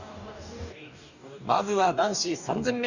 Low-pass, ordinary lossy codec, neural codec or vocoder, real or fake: 7.2 kHz; none; codec, 44.1 kHz, 2.6 kbps, DAC; fake